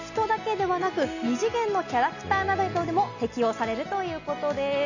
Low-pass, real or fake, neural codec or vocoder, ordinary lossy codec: 7.2 kHz; real; none; none